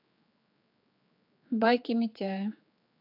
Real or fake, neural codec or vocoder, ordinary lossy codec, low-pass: fake; codec, 16 kHz, 4 kbps, X-Codec, HuBERT features, trained on general audio; AAC, 48 kbps; 5.4 kHz